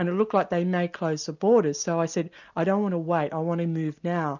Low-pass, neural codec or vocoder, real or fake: 7.2 kHz; none; real